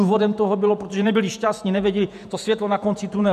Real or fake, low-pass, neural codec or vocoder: fake; 14.4 kHz; vocoder, 48 kHz, 128 mel bands, Vocos